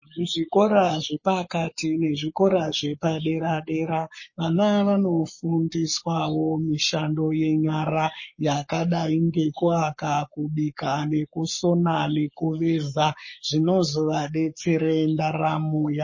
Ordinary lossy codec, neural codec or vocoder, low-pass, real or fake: MP3, 32 kbps; codec, 44.1 kHz, 7.8 kbps, Pupu-Codec; 7.2 kHz; fake